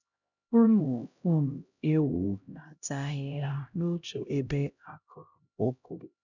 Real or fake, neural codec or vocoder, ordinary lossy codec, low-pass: fake; codec, 16 kHz, 0.5 kbps, X-Codec, HuBERT features, trained on LibriSpeech; none; 7.2 kHz